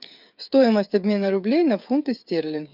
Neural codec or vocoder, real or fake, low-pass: codec, 16 kHz, 8 kbps, FreqCodec, smaller model; fake; 5.4 kHz